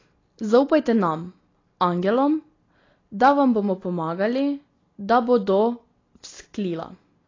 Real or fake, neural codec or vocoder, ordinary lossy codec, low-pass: real; none; AAC, 32 kbps; 7.2 kHz